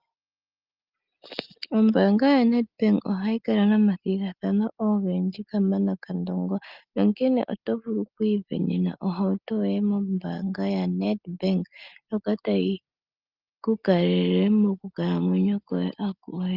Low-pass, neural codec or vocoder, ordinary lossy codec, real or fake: 5.4 kHz; none; Opus, 24 kbps; real